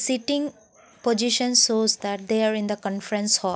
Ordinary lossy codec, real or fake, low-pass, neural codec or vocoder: none; real; none; none